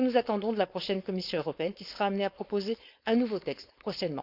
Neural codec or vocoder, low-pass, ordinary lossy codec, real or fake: codec, 16 kHz, 4.8 kbps, FACodec; 5.4 kHz; Opus, 64 kbps; fake